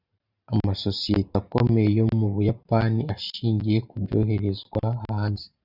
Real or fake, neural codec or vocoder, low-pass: fake; vocoder, 44.1 kHz, 128 mel bands every 512 samples, BigVGAN v2; 5.4 kHz